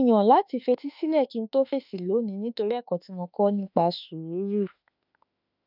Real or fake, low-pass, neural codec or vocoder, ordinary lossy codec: fake; 5.4 kHz; autoencoder, 48 kHz, 32 numbers a frame, DAC-VAE, trained on Japanese speech; none